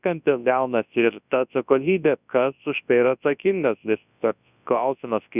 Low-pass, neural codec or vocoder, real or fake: 3.6 kHz; codec, 24 kHz, 0.9 kbps, WavTokenizer, large speech release; fake